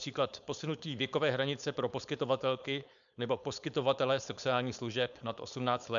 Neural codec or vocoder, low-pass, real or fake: codec, 16 kHz, 4.8 kbps, FACodec; 7.2 kHz; fake